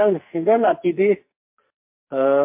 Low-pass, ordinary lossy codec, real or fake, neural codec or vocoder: 3.6 kHz; MP3, 32 kbps; fake; codec, 44.1 kHz, 2.6 kbps, SNAC